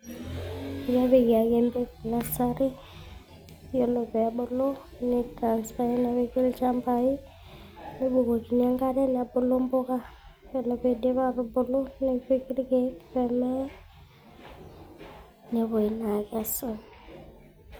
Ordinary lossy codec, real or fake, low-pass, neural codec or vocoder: none; real; none; none